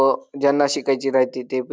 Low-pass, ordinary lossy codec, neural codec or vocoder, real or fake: none; none; none; real